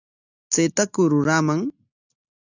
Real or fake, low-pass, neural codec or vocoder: real; 7.2 kHz; none